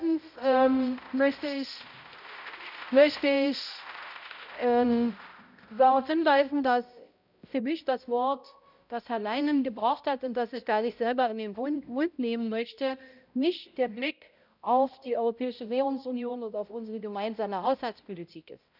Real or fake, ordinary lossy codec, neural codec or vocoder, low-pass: fake; none; codec, 16 kHz, 0.5 kbps, X-Codec, HuBERT features, trained on balanced general audio; 5.4 kHz